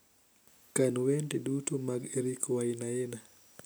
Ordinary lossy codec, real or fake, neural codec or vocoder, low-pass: none; real; none; none